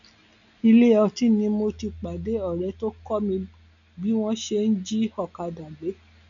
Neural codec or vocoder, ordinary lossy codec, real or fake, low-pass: none; none; real; 7.2 kHz